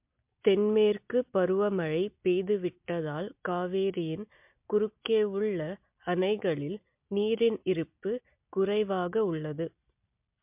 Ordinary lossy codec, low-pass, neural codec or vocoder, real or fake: MP3, 32 kbps; 3.6 kHz; none; real